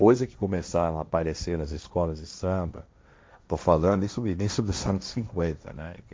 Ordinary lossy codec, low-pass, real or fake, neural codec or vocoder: none; none; fake; codec, 16 kHz, 1.1 kbps, Voila-Tokenizer